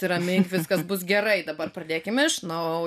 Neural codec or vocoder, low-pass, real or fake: none; 14.4 kHz; real